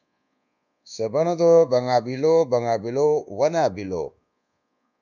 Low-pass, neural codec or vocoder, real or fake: 7.2 kHz; codec, 24 kHz, 1.2 kbps, DualCodec; fake